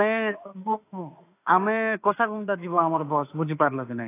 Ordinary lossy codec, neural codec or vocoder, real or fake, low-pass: AAC, 24 kbps; autoencoder, 48 kHz, 32 numbers a frame, DAC-VAE, trained on Japanese speech; fake; 3.6 kHz